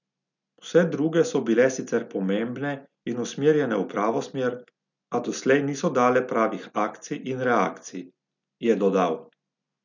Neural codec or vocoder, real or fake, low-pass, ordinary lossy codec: none; real; 7.2 kHz; none